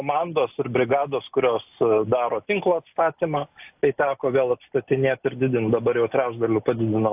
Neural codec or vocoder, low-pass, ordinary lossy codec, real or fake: none; 3.6 kHz; AAC, 32 kbps; real